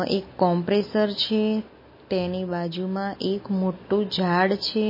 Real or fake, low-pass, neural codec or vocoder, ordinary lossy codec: real; 5.4 kHz; none; MP3, 24 kbps